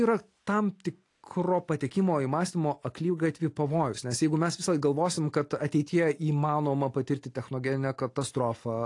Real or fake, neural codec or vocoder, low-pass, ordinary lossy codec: real; none; 10.8 kHz; AAC, 48 kbps